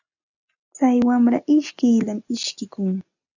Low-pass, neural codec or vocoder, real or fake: 7.2 kHz; none; real